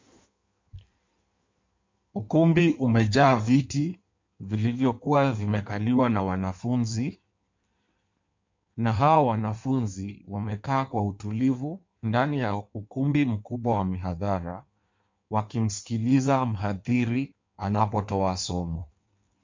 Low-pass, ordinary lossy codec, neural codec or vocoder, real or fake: 7.2 kHz; MP3, 64 kbps; codec, 16 kHz in and 24 kHz out, 1.1 kbps, FireRedTTS-2 codec; fake